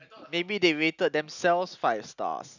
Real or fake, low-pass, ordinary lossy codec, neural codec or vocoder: real; 7.2 kHz; none; none